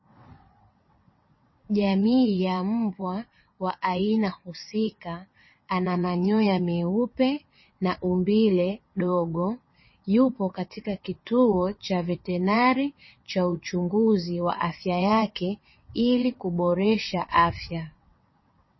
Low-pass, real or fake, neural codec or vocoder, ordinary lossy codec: 7.2 kHz; fake; vocoder, 22.05 kHz, 80 mel bands, WaveNeXt; MP3, 24 kbps